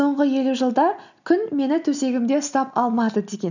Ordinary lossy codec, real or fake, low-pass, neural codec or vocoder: none; real; 7.2 kHz; none